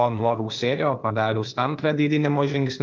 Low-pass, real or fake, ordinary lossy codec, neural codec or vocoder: 7.2 kHz; fake; Opus, 32 kbps; codec, 16 kHz, 0.8 kbps, ZipCodec